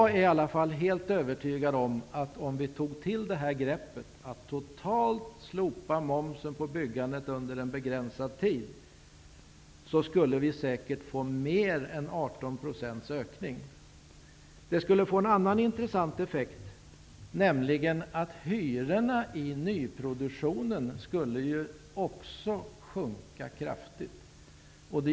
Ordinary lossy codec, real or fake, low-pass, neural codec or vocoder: none; real; none; none